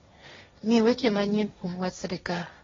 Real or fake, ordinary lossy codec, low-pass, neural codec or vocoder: fake; AAC, 24 kbps; 7.2 kHz; codec, 16 kHz, 1.1 kbps, Voila-Tokenizer